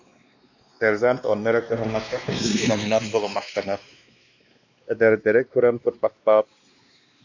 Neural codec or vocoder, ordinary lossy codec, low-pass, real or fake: codec, 16 kHz, 2 kbps, X-Codec, WavLM features, trained on Multilingual LibriSpeech; MP3, 64 kbps; 7.2 kHz; fake